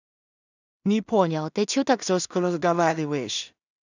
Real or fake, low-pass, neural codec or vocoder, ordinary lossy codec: fake; 7.2 kHz; codec, 16 kHz in and 24 kHz out, 0.4 kbps, LongCat-Audio-Codec, two codebook decoder; none